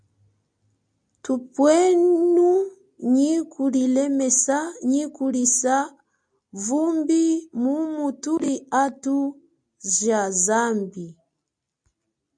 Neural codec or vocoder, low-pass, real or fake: none; 9.9 kHz; real